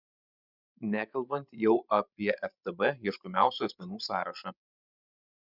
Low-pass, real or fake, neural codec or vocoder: 5.4 kHz; fake; codec, 16 kHz, 16 kbps, FreqCodec, larger model